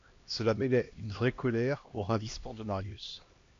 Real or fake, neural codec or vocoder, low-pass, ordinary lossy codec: fake; codec, 16 kHz, 1 kbps, X-Codec, HuBERT features, trained on LibriSpeech; 7.2 kHz; AAC, 48 kbps